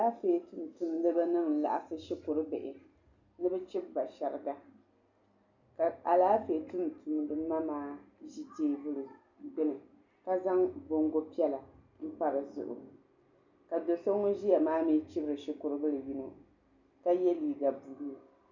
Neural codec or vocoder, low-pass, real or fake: none; 7.2 kHz; real